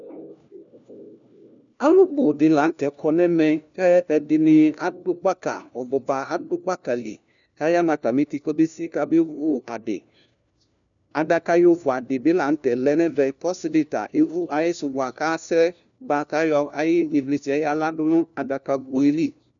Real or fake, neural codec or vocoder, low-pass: fake; codec, 16 kHz, 1 kbps, FunCodec, trained on LibriTTS, 50 frames a second; 7.2 kHz